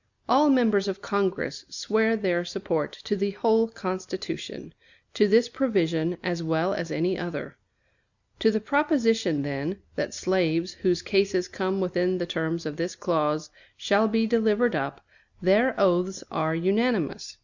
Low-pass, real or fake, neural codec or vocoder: 7.2 kHz; real; none